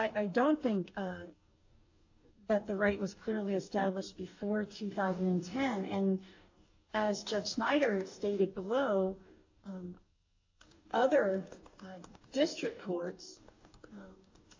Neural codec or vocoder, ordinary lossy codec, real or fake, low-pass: codec, 44.1 kHz, 2.6 kbps, DAC; AAC, 48 kbps; fake; 7.2 kHz